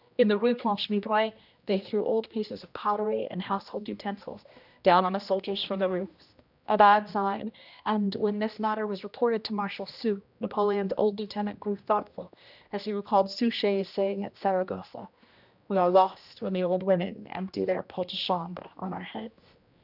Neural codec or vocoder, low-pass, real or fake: codec, 16 kHz, 1 kbps, X-Codec, HuBERT features, trained on general audio; 5.4 kHz; fake